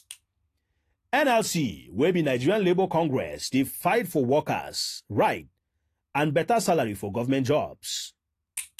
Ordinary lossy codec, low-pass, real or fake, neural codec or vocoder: AAC, 48 kbps; 14.4 kHz; real; none